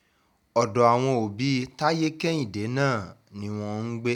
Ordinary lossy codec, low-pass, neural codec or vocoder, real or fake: none; 19.8 kHz; none; real